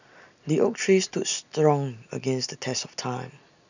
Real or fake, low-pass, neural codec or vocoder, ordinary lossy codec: real; 7.2 kHz; none; none